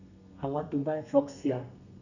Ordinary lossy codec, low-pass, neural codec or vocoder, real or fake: none; 7.2 kHz; codec, 32 kHz, 1.9 kbps, SNAC; fake